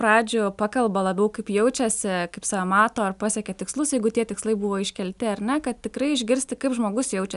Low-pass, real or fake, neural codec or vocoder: 10.8 kHz; real; none